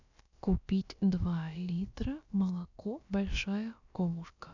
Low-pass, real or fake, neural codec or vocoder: 7.2 kHz; fake; codec, 16 kHz, about 1 kbps, DyCAST, with the encoder's durations